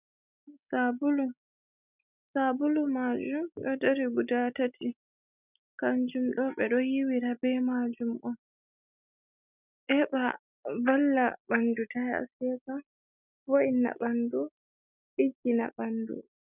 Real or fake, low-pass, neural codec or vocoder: real; 3.6 kHz; none